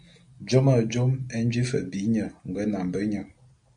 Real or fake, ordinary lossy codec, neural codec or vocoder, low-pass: real; MP3, 64 kbps; none; 9.9 kHz